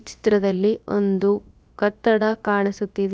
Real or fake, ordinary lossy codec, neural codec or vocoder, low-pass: fake; none; codec, 16 kHz, about 1 kbps, DyCAST, with the encoder's durations; none